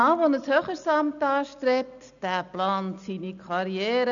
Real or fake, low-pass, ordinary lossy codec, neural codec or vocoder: real; 7.2 kHz; none; none